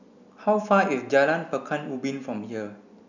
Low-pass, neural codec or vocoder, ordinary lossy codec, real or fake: 7.2 kHz; none; none; real